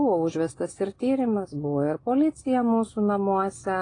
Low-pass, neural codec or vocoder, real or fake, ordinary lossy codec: 9.9 kHz; none; real; AAC, 32 kbps